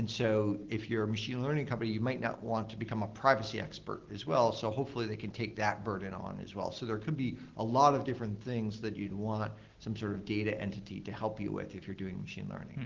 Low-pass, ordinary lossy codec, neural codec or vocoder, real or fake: 7.2 kHz; Opus, 16 kbps; none; real